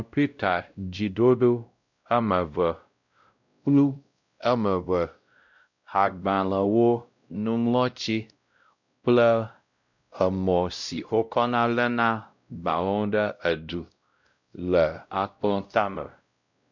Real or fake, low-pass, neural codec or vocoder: fake; 7.2 kHz; codec, 16 kHz, 0.5 kbps, X-Codec, WavLM features, trained on Multilingual LibriSpeech